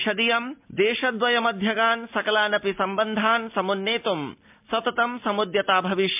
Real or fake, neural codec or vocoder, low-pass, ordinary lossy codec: real; none; 3.6 kHz; MP3, 32 kbps